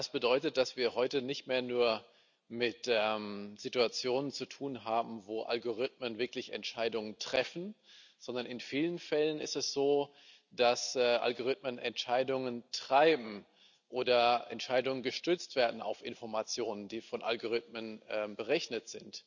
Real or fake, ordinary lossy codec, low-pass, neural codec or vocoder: real; none; 7.2 kHz; none